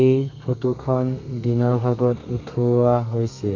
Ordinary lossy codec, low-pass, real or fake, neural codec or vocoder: none; 7.2 kHz; fake; codec, 32 kHz, 1.9 kbps, SNAC